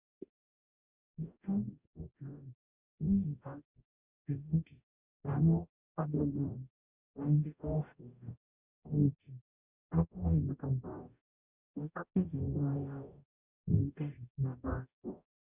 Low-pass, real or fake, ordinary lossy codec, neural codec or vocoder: 3.6 kHz; fake; Opus, 64 kbps; codec, 44.1 kHz, 0.9 kbps, DAC